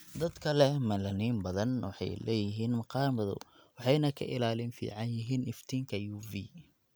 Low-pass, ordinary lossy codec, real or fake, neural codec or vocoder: none; none; real; none